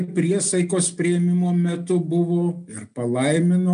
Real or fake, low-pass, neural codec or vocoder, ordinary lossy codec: real; 9.9 kHz; none; MP3, 96 kbps